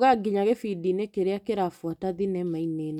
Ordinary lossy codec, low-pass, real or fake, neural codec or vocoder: none; 19.8 kHz; real; none